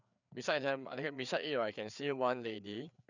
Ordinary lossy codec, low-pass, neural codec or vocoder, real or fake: none; 7.2 kHz; codec, 16 kHz, 4 kbps, FreqCodec, larger model; fake